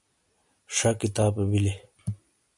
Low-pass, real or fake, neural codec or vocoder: 10.8 kHz; real; none